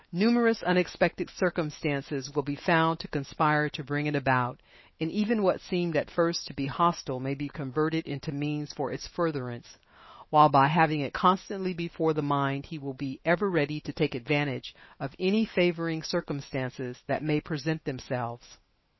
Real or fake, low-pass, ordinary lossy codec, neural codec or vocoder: fake; 7.2 kHz; MP3, 24 kbps; autoencoder, 48 kHz, 128 numbers a frame, DAC-VAE, trained on Japanese speech